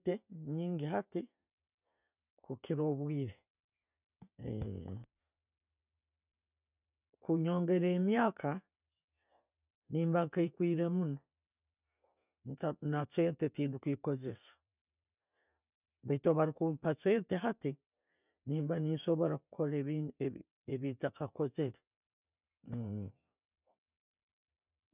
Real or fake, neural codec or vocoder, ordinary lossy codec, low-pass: real; none; none; 3.6 kHz